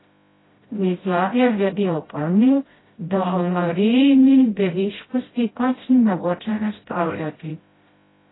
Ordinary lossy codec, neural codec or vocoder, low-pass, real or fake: AAC, 16 kbps; codec, 16 kHz, 0.5 kbps, FreqCodec, smaller model; 7.2 kHz; fake